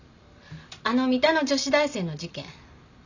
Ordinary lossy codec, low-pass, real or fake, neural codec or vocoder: none; 7.2 kHz; real; none